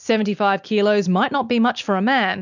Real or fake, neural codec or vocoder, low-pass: real; none; 7.2 kHz